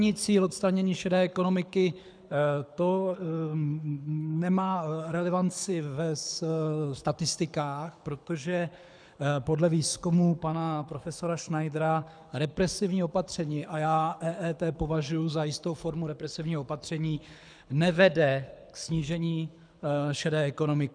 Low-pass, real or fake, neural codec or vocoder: 9.9 kHz; fake; codec, 24 kHz, 6 kbps, HILCodec